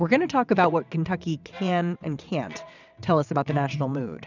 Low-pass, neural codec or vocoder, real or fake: 7.2 kHz; vocoder, 22.05 kHz, 80 mel bands, WaveNeXt; fake